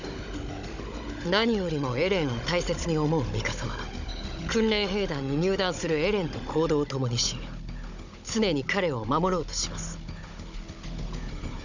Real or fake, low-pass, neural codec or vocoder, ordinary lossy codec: fake; 7.2 kHz; codec, 16 kHz, 16 kbps, FunCodec, trained on Chinese and English, 50 frames a second; none